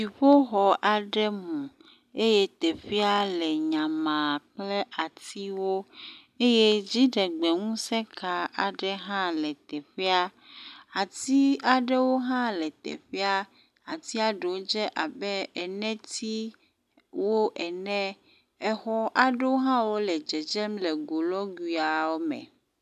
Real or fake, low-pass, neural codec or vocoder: real; 14.4 kHz; none